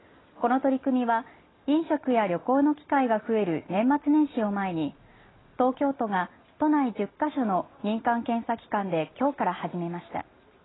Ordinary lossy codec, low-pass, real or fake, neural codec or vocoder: AAC, 16 kbps; 7.2 kHz; real; none